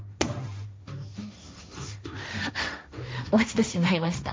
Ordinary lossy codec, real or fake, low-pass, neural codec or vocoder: none; fake; none; codec, 16 kHz, 1.1 kbps, Voila-Tokenizer